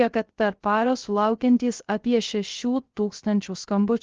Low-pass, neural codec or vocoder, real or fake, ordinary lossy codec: 7.2 kHz; codec, 16 kHz, 0.3 kbps, FocalCodec; fake; Opus, 16 kbps